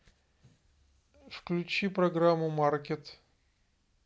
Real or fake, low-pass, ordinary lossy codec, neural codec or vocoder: real; none; none; none